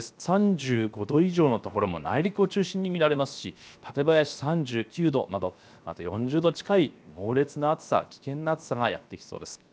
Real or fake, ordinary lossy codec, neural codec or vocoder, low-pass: fake; none; codec, 16 kHz, 0.7 kbps, FocalCodec; none